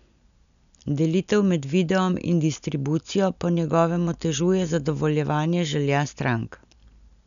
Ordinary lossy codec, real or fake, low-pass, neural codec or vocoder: none; real; 7.2 kHz; none